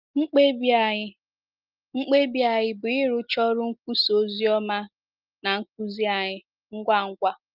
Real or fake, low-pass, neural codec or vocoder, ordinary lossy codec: real; 5.4 kHz; none; Opus, 24 kbps